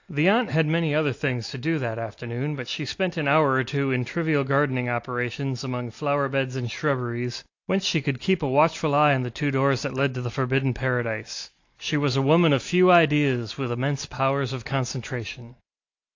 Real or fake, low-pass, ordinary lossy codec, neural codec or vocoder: real; 7.2 kHz; AAC, 48 kbps; none